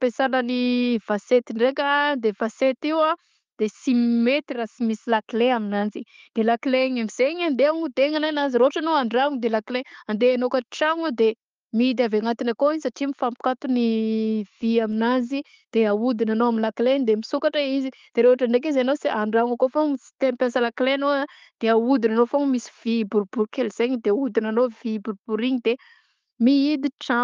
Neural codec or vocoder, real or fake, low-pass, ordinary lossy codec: none; real; 7.2 kHz; Opus, 32 kbps